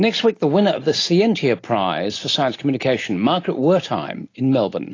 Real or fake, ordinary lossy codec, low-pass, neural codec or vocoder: real; AAC, 32 kbps; 7.2 kHz; none